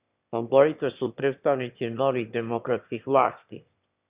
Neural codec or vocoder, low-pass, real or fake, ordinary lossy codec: autoencoder, 22.05 kHz, a latent of 192 numbers a frame, VITS, trained on one speaker; 3.6 kHz; fake; Opus, 64 kbps